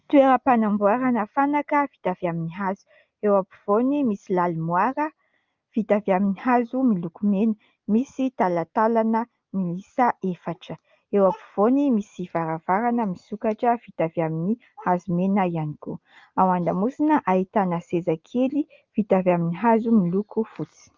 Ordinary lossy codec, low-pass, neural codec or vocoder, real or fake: Opus, 24 kbps; 7.2 kHz; none; real